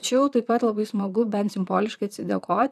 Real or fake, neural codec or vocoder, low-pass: fake; vocoder, 44.1 kHz, 128 mel bands, Pupu-Vocoder; 14.4 kHz